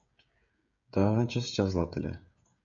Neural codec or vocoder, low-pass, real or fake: codec, 16 kHz, 16 kbps, FreqCodec, smaller model; 7.2 kHz; fake